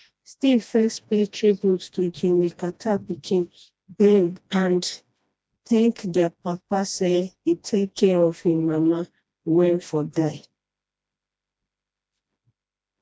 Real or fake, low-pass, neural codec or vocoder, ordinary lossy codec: fake; none; codec, 16 kHz, 1 kbps, FreqCodec, smaller model; none